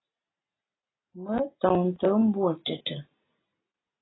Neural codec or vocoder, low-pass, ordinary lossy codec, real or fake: none; 7.2 kHz; AAC, 16 kbps; real